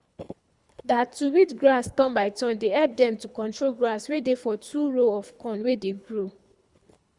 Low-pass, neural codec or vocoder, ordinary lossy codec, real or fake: 10.8 kHz; codec, 24 kHz, 3 kbps, HILCodec; Opus, 64 kbps; fake